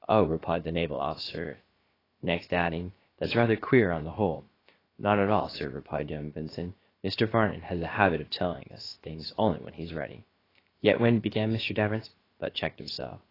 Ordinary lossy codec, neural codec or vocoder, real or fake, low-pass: AAC, 24 kbps; codec, 16 kHz, about 1 kbps, DyCAST, with the encoder's durations; fake; 5.4 kHz